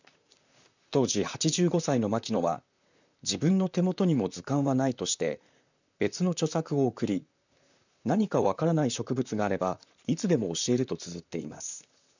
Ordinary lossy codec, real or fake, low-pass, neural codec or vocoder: none; fake; 7.2 kHz; vocoder, 44.1 kHz, 128 mel bands, Pupu-Vocoder